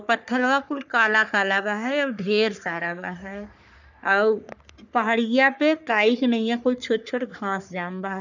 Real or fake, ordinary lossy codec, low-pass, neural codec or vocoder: fake; none; 7.2 kHz; codec, 44.1 kHz, 3.4 kbps, Pupu-Codec